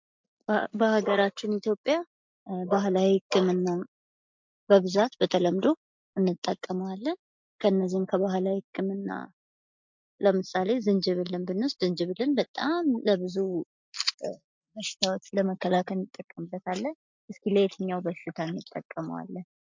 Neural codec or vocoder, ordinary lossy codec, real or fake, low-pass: none; MP3, 64 kbps; real; 7.2 kHz